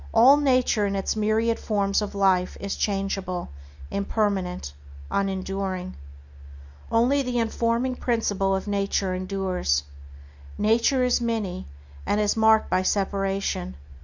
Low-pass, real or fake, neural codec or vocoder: 7.2 kHz; real; none